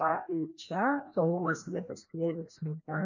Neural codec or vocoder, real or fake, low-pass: codec, 16 kHz, 1 kbps, FreqCodec, larger model; fake; 7.2 kHz